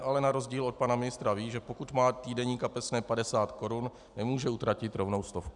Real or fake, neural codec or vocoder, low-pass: real; none; 10.8 kHz